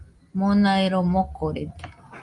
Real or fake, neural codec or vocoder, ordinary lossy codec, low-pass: real; none; Opus, 32 kbps; 10.8 kHz